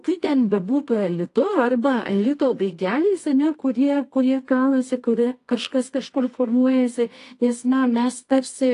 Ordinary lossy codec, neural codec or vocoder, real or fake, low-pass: AAC, 48 kbps; codec, 24 kHz, 0.9 kbps, WavTokenizer, medium music audio release; fake; 10.8 kHz